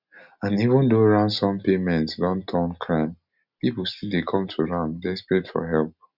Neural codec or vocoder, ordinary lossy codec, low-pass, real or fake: none; none; 5.4 kHz; real